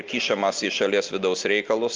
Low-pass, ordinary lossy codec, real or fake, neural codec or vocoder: 7.2 kHz; Opus, 24 kbps; real; none